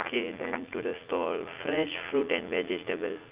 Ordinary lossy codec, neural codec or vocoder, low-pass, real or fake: Opus, 24 kbps; vocoder, 44.1 kHz, 80 mel bands, Vocos; 3.6 kHz; fake